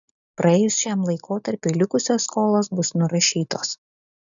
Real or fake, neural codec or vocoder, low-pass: real; none; 7.2 kHz